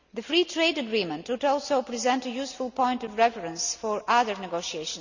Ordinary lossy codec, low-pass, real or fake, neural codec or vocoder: none; 7.2 kHz; real; none